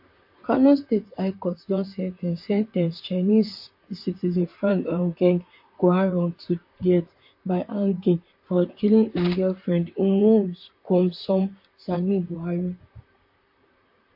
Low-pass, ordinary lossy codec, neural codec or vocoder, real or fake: 5.4 kHz; MP3, 32 kbps; vocoder, 44.1 kHz, 128 mel bands, Pupu-Vocoder; fake